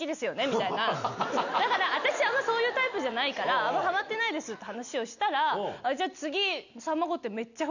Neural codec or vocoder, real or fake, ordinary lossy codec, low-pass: none; real; none; 7.2 kHz